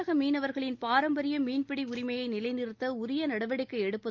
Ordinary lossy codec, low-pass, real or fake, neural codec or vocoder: Opus, 24 kbps; 7.2 kHz; real; none